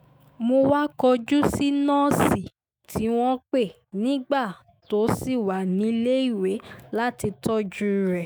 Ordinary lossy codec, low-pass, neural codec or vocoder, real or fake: none; none; autoencoder, 48 kHz, 128 numbers a frame, DAC-VAE, trained on Japanese speech; fake